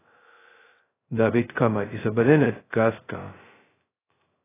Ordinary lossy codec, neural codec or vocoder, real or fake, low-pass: AAC, 16 kbps; codec, 16 kHz, 0.2 kbps, FocalCodec; fake; 3.6 kHz